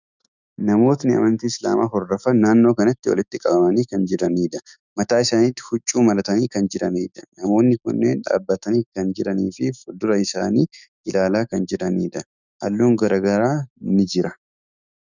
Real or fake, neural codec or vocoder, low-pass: fake; autoencoder, 48 kHz, 128 numbers a frame, DAC-VAE, trained on Japanese speech; 7.2 kHz